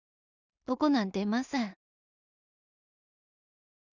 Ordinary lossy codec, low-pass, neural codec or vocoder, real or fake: none; 7.2 kHz; codec, 16 kHz in and 24 kHz out, 0.4 kbps, LongCat-Audio-Codec, two codebook decoder; fake